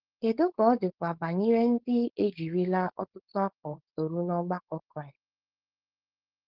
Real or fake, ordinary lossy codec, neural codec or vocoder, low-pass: fake; Opus, 16 kbps; codec, 16 kHz, 4.8 kbps, FACodec; 5.4 kHz